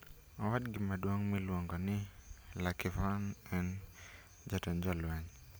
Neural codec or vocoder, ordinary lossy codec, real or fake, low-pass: none; none; real; none